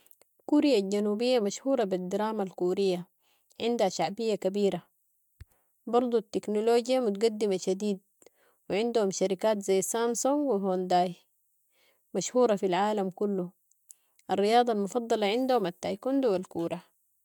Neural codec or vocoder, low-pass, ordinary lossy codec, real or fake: none; 19.8 kHz; MP3, 96 kbps; real